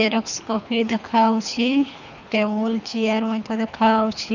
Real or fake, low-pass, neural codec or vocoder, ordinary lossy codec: fake; 7.2 kHz; codec, 24 kHz, 3 kbps, HILCodec; none